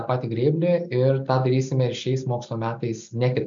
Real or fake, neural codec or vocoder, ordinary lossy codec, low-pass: real; none; MP3, 64 kbps; 7.2 kHz